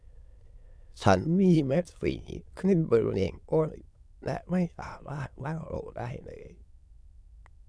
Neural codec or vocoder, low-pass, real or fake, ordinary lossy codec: autoencoder, 22.05 kHz, a latent of 192 numbers a frame, VITS, trained on many speakers; none; fake; none